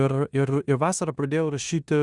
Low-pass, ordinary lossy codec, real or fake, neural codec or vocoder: 10.8 kHz; Opus, 64 kbps; fake; codec, 16 kHz in and 24 kHz out, 0.9 kbps, LongCat-Audio-Codec, four codebook decoder